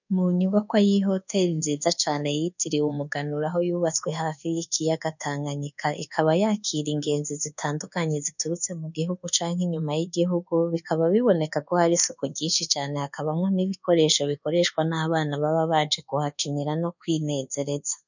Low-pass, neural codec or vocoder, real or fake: 7.2 kHz; codec, 24 kHz, 1.2 kbps, DualCodec; fake